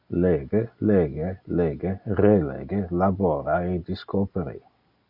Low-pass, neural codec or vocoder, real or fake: 5.4 kHz; none; real